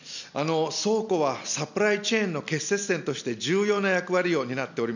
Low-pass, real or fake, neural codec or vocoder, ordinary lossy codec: 7.2 kHz; real; none; none